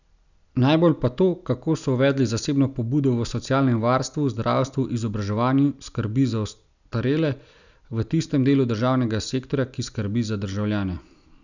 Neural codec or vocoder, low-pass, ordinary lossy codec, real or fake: none; 7.2 kHz; none; real